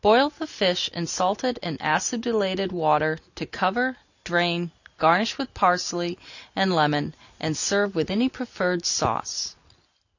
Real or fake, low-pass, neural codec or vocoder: real; 7.2 kHz; none